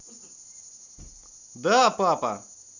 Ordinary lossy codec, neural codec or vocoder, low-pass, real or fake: none; none; 7.2 kHz; real